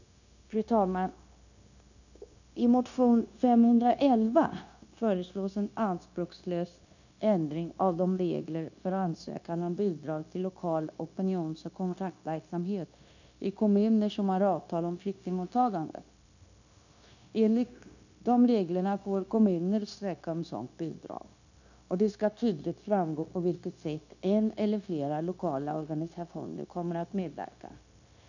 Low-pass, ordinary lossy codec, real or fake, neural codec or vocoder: 7.2 kHz; none; fake; codec, 16 kHz, 0.9 kbps, LongCat-Audio-Codec